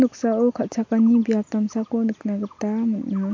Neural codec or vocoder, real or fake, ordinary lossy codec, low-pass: none; real; MP3, 48 kbps; 7.2 kHz